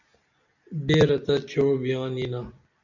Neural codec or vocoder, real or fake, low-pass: none; real; 7.2 kHz